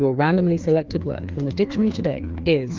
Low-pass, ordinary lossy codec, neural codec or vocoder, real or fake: 7.2 kHz; Opus, 24 kbps; codec, 16 kHz, 2 kbps, FreqCodec, larger model; fake